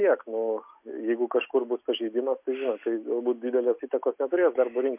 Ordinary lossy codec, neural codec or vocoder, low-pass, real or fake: MP3, 32 kbps; none; 3.6 kHz; real